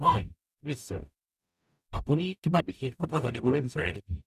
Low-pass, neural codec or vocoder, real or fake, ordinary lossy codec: 14.4 kHz; codec, 44.1 kHz, 0.9 kbps, DAC; fake; none